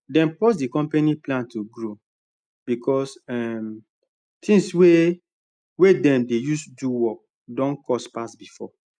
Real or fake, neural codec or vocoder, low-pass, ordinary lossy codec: real; none; 9.9 kHz; none